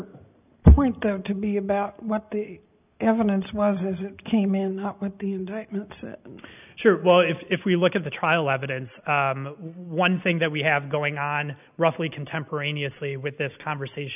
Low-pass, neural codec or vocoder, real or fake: 3.6 kHz; none; real